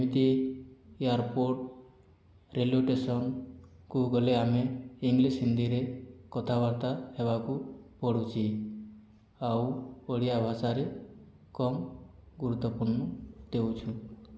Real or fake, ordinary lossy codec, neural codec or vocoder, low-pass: real; none; none; none